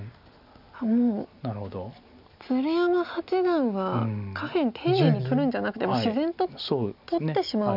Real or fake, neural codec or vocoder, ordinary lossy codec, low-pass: real; none; none; 5.4 kHz